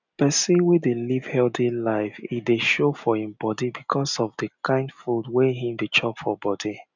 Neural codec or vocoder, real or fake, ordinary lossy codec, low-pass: none; real; none; 7.2 kHz